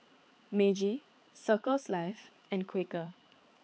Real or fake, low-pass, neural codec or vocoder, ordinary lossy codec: fake; none; codec, 16 kHz, 4 kbps, X-Codec, HuBERT features, trained on balanced general audio; none